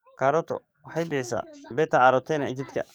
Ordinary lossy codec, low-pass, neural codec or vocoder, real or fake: none; none; codec, 44.1 kHz, 7.8 kbps, DAC; fake